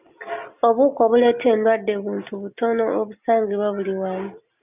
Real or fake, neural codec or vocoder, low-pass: real; none; 3.6 kHz